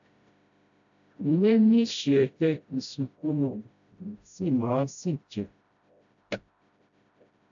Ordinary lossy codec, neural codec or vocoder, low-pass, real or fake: AAC, 64 kbps; codec, 16 kHz, 0.5 kbps, FreqCodec, smaller model; 7.2 kHz; fake